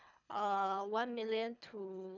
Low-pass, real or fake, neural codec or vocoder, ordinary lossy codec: 7.2 kHz; fake; codec, 24 kHz, 3 kbps, HILCodec; none